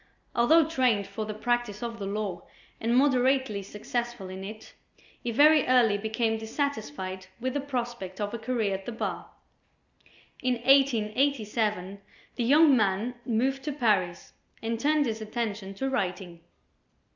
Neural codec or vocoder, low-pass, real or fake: none; 7.2 kHz; real